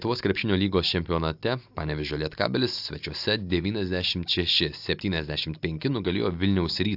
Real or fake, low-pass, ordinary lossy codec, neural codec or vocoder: real; 5.4 kHz; AAC, 48 kbps; none